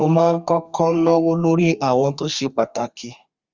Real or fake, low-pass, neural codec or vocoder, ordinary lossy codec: fake; 7.2 kHz; codec, 32 kHz, 1.9 kbps, SNAC; Opus, 32 kbps